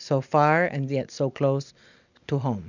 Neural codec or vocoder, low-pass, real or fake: none; 7.2 kHz; real